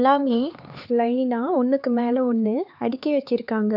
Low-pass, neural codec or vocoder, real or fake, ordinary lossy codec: 5.4 kHz; codec, 16 kHz, 4 kbps, X-Codec, HuBERT features, trained on LibriSpeech; fake; none